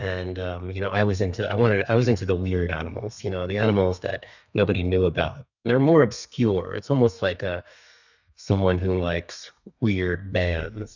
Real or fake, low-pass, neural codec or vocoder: fake; 7.2 kHz; codec, 32 kHz, 1.9 kbps, SNAC